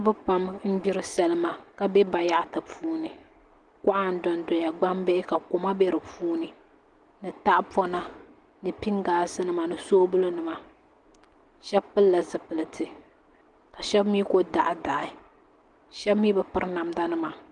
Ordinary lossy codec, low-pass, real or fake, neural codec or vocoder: Opus, 24 kbps; 10.8 kHz; real; none